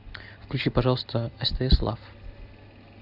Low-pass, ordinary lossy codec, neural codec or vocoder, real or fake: 5.4 kHz; AAC, 48 kbps; none; real